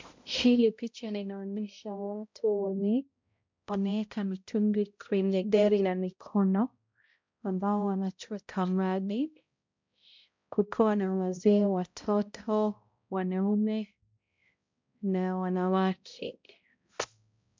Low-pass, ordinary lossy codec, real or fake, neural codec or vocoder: 7.2 kHz; MP3, 64 kbps; fake; codec, 16 kHz, 0.5 kbps, X-Codec, HuBERT features, trained on balanced general audio